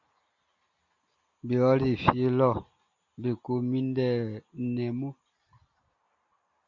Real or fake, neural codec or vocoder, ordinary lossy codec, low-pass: real; none; Opus, 64 kbps; 7.2 kHz